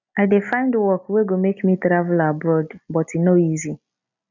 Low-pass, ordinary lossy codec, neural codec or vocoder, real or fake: 7.2 kHz; none; none; real